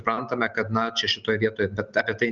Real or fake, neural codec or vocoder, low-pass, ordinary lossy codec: real; none; 7.2 kHz; Opus, 24 kbps